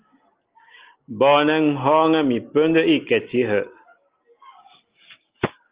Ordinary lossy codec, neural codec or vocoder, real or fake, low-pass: Opus, 32 kbps; none; real; 3.6 kHz